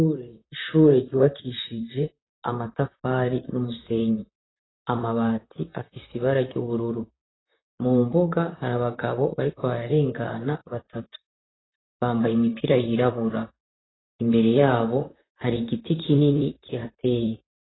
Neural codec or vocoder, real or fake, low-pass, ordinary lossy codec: vocoder, 44.1 kHz, 128 mel bands, Pupu-Vocoder; fake; 7.2 kHz; AAC, 16 kbps